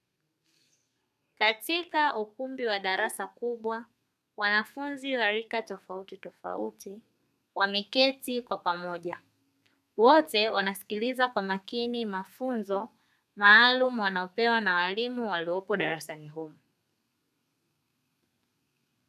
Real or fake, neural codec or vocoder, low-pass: fake; codec, 32 kHz, 1.9 kbps, SNAC; 14.4 kHz